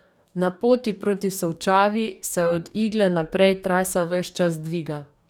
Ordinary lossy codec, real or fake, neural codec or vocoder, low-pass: none; fake; codec, 44.1 kHz, 2.6 kbps, DAC; 19.8 kHz